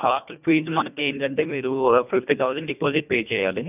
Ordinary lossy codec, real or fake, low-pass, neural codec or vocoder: none; fake; 3.6 kHz; codec, 24 kHz, 1.5 kbps, HILCodec